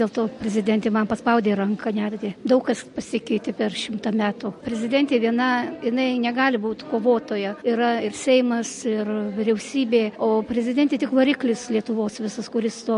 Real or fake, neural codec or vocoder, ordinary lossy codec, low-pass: real; none; MP3, 48 kbps; 14.4 kHz